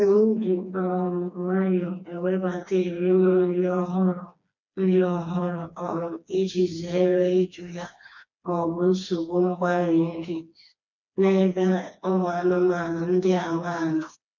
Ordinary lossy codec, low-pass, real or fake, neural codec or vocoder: MP3, 64 kbps; 7.2 kHz; fake; codec, 16 kHz, 2 kbps, FreqCodec, smaller model